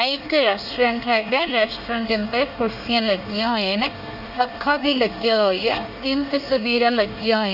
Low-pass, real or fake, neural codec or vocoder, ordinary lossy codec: 5.4 kHz; fake; codec, 24 kHz, 1 kbps, SNAC; none